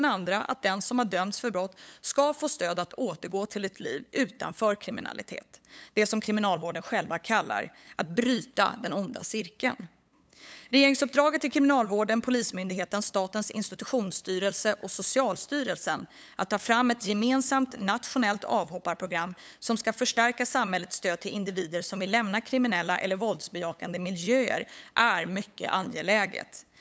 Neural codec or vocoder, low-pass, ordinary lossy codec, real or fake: codec, 16 kHz, 8 kbps, FunCodec, trained on LibriTTS, 25 frames a second; none; none; fake